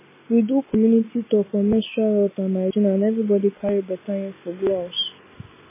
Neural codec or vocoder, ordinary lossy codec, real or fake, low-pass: none; MP3, 16 kbps; real; 3.6 kHz